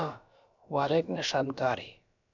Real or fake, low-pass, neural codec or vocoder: fake; 7.2 kHz; codec, 16 kHz, about 1 kbps, DyCAST, with the encoder's durations